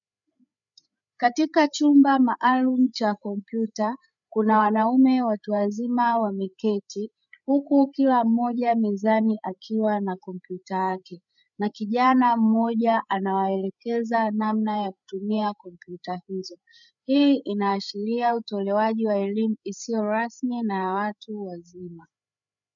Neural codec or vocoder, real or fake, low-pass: codec, 16 kHz, 8 kbps, FreqCodec, larger model; fake; 7.2 kHz